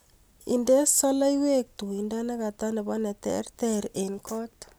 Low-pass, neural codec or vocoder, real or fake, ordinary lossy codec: none; none; real; none